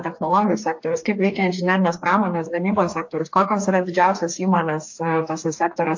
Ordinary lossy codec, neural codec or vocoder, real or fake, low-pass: AAC, 48 kbps; codec, 44.1 kHz, 2.6 kbps, DAC; fake; 7.2 kHz